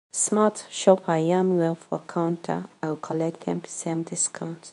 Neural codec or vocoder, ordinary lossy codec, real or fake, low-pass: codec, 24 kHz, 0.9 kbps, WavTokenizer, medium speech release version 2; none; fake; 10.8 kHz